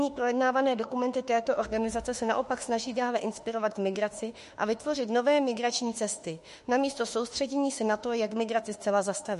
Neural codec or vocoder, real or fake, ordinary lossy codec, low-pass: autoencoder, 48 kHz, 32 numbers a frame, DAC-VAE, trained on Japanese speech; fake; MP3, 48 kbps; 14.4 kHz